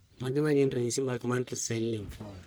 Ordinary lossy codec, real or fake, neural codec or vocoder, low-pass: none; fake; codec, 44.1 kHz, 1.7 kbps, Pupu-Codec; none